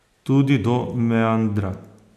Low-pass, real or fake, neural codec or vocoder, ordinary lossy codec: 14.4 kHz; real; none; none